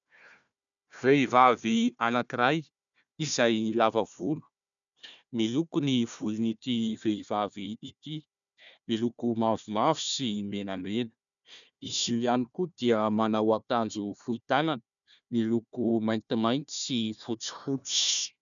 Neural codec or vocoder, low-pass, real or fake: codec, 16 kHz, 1 kbps, FunCodec, trained on Chinese and English, 50 frames a second; 7.2 kHz; fake